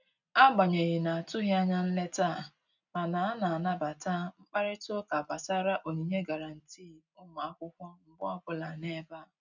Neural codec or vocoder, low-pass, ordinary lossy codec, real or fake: none; 7.2 kHz; none; real